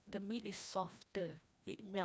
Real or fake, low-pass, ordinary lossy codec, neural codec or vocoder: fake; none; none; codec, 16 kHz, 1 kbps, FreqCodec, larger model